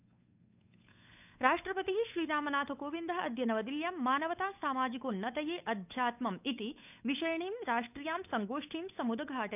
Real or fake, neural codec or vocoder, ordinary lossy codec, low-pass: fake; codec, 16 kHz, 8 kbps, FunCodec, trained on Chinese and English, 25 frames a second; none; 3.6 kHz